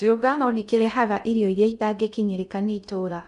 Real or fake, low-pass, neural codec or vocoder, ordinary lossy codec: fake; 10.8 kHz; codec, 16 kHz in and 24 kHz out, 0.6 kbps, FocalCodec, streaming, 2048 codes; none